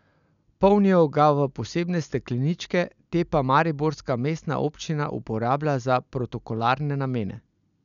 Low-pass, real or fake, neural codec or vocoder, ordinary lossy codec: 7.2 kHz; real; none; none